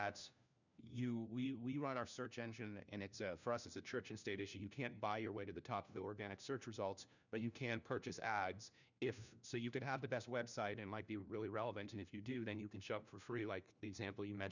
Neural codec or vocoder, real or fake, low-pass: codec, 16 kHz, 1 kbps, FunCodec, trained on LibriTTS, 50 frames a second; fake; 7.2 kHz